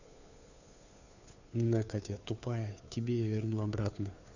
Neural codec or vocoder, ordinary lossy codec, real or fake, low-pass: codec, 16 kHz, 2 kbps, FunCodec, trained on Chinese and English, 25 frames a second; none; fake; 7.2 kHz